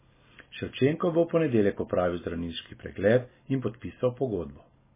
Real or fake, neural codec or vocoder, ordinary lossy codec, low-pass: real; none; MP3, 16 kbps; 3.6 kHz